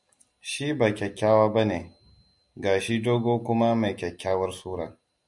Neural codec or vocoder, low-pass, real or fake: none; 10.8 kHz; real